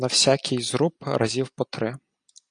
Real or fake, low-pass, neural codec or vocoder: real; 9.9 kHz; none